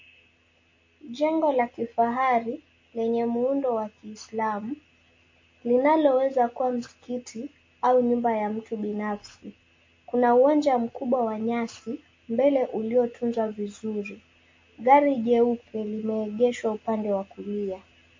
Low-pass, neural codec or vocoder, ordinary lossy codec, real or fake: 7.2 kHz; none; MP3, 32 kbps; real